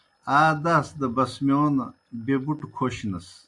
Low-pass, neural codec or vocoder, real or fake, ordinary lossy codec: 10.8 kHz; none; real; AAC, 64 kbps